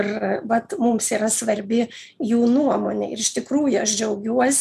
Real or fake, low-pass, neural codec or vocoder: real; 14.4 kHz; none